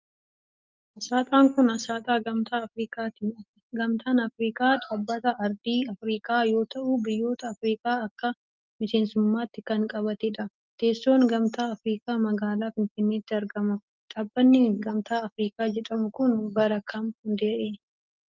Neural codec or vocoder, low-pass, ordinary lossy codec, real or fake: none; 7.2 kHz; Opus, 24 kbps; real